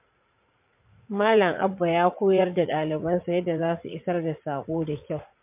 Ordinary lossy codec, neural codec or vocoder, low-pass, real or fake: MP3, 32 kbps; vocoder, 44.1 kHz, 80 mel bands, Vocos; 3.6 kHz; fake